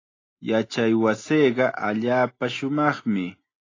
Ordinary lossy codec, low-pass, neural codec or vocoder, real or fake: AAC, 32 kbps; 7.2 kHz; none; real